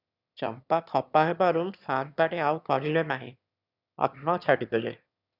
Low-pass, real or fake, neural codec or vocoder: 5.4 kHz; fake; autoencoder, 22.05 kHz, a latent of 192 numbers a frame, VITS, trained on one speaker